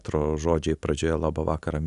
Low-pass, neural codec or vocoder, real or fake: 10.8 kHz; none; real